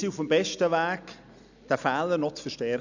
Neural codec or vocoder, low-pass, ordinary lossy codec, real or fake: none; 7.2 kHz; none; real